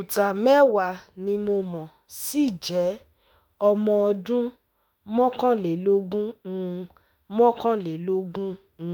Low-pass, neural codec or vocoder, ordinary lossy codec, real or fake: none; autoencoder, 48 kHz, 32 numbers a frame, DAC-VAE, trained on Japanese speech; none; fake